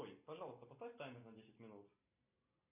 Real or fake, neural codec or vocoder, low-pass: real; none; 3.6 kHz